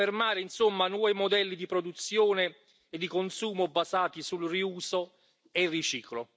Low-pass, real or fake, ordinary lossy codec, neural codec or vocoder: none; real; none; none